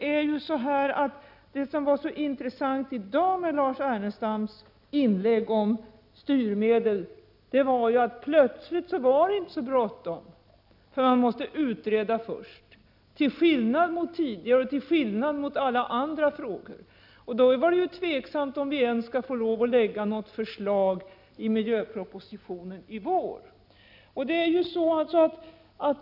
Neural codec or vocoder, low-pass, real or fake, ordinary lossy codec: none; 5.4 kHz; real; none